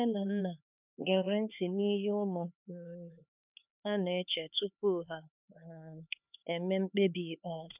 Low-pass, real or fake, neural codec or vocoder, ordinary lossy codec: 3.6 kHz; fake; codec, 16 kHz, 4 kbps, X-Codec, HuBERT features, trained on LibriSpeech; none